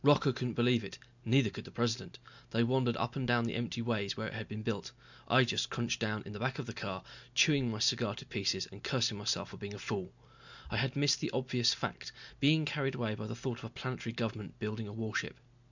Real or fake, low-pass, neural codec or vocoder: real; 7.2 kHz; none